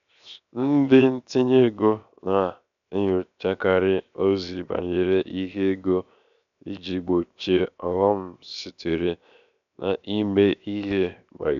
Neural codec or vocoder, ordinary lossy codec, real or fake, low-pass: codec, 16 kHz, 0.7 kbps, FocalCodec; none; fake; 7.2 kHz